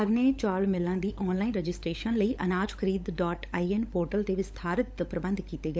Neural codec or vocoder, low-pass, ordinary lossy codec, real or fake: codec, 16 kHz, 16 kbps, FunCodec, trained on LibriTTS, 50 frames a second; none; none; fake